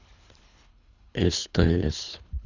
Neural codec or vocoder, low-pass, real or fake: codec, 24 kHz, 3 kbps, HILCodec; 7.2 kHz; fake